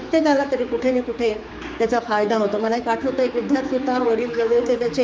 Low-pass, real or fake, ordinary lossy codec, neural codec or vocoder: none; fake; none; codec, 16 kHz, 4 kbps, X-Codec, HuBERT features, trained on general audio